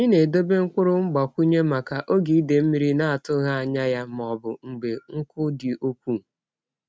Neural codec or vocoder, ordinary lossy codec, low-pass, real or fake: none; none; none; real